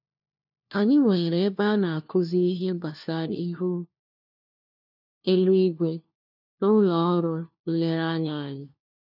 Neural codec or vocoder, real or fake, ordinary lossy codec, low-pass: codec, 16 kHz, 1 kbps, FunCodec, trained on LibriTTS, 50 frames a second; fake; none; 5.4 kHz